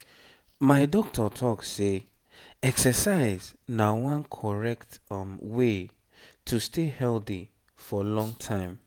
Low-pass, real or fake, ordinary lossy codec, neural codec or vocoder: none; fake; none; vocoder, 48 kHz, 128 mel bands, Vocos